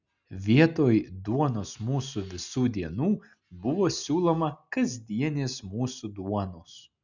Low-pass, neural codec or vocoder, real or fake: 7.2 kHz; none; real